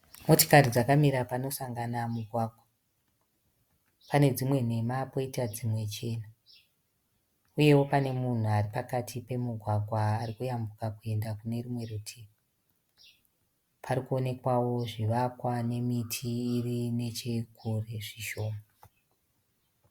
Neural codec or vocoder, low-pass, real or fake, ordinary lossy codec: none; 19.8 kHz; real; Opus, 64 kbps